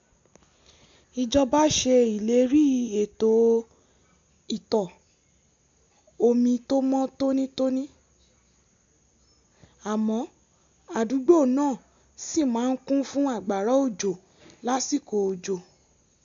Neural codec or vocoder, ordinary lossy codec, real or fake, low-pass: none; AAC, 64 kbps; real; 7.2 kHz